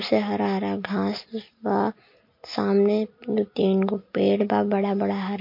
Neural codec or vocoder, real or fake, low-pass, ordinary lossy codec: none; real; 5.4 kHz; MP3, 32 kbps